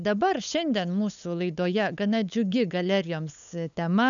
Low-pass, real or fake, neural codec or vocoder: 7.2 kHz; fake; codec, 16 kHz, 8 kbps, FunCodec, trained on LibriTTS, 25 frames a second